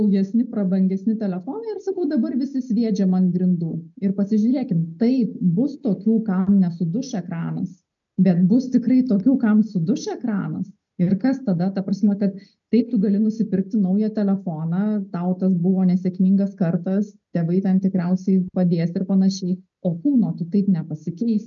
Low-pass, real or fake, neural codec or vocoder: 7.2 kHz; real; none